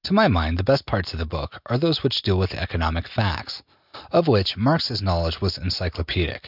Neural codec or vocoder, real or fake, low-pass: none; real; 5.4 kHz